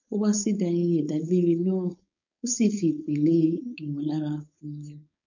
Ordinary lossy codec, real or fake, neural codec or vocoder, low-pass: none; fake; codec, 16 kHz, 4.8 kbps, FACodec; 7.2 kHz